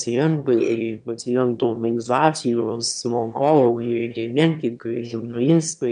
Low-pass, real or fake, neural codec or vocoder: 9.9 kHz; fake; autoencoder, 22.05 kHz, a latent of 192 numbers a frame, VITS, trained on one speaker